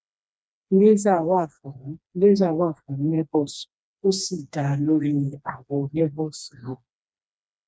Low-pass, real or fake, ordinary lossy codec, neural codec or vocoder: none; fake; none; codec, 16 kHz, 2 kbps, FreqCodec, smaller model